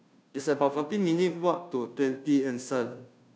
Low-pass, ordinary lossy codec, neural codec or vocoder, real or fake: none; none; codec, 16 kHz, 0.5 kbps, FunCodec, trained on Chinese and English, 25 frames a second; fake